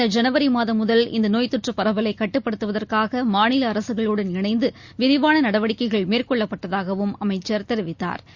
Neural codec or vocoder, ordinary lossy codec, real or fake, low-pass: none; Opus, 64 kbps; real; 7.2 kHz